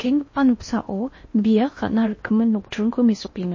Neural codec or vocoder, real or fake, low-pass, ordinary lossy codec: codec, 16 kHz in and 24 kHz out, 0.8 kbps, FocalCodec, streaming, 65536 codes; fake; 7.2 kHz; MP3, 32 kbps